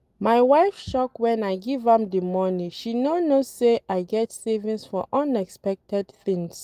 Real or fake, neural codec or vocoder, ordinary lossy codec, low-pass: real; none; Opus, 32 kbps; 14.4 kHz